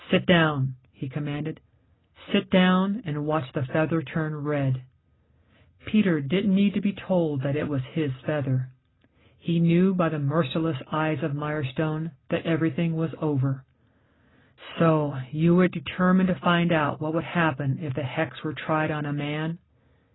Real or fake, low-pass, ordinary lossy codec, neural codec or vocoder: real; 7.2 kHz; AAC, 16 kbps; none